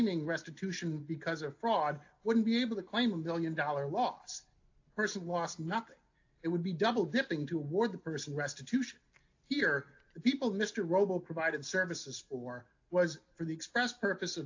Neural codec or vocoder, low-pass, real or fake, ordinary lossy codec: none; 7.2 kHz; real; AAC, 48 kbps